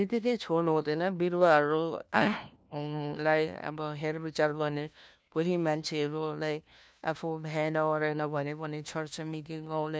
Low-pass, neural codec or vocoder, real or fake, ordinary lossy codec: none; codec, 16 kHz, 1 kbps, FunCodec, trained on LibriTTS, 50 frames a second; fake; none